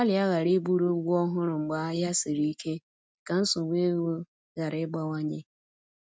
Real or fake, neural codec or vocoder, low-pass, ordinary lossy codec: real; none; none; none